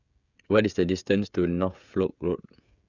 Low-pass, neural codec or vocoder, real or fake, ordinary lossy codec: 7.2 kHz; codec, 16 kHz, 16 kbps, FreqCodec, smaller model; fake; none